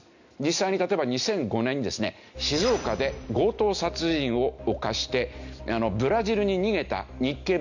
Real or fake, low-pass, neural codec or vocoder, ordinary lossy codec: real; 7.2 kHz; none; none